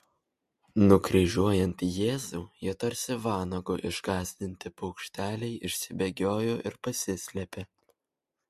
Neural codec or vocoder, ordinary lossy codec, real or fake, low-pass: none; MP3, 64 kbps; real; 14.4 kHz